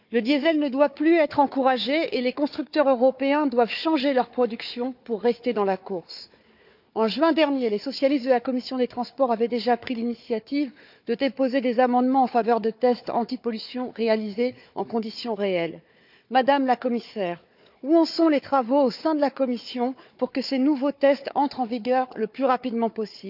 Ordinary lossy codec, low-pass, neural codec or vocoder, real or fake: none; 5.4 kHz; codec, 16 kHz, 4 kbps, FunCodec, trained on Chinese and English, 50 frames a second; fake